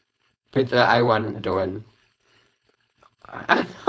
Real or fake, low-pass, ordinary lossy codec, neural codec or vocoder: fake; none; none; codec, 16 kHz, 4.8 kbps, FACodec